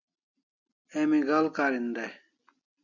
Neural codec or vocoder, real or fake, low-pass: none; real; 7.2 kHz